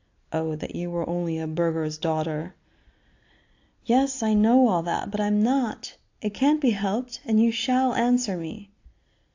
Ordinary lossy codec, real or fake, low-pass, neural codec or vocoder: AAC, 48 kbps; real; 7.2 kHz; none